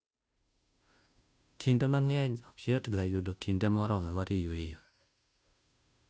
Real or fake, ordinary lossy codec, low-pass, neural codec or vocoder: fake; none; none; codec, 16 kHz, 0.5 kbps, FunCodec, trained on Chinese and English, 25 frames a second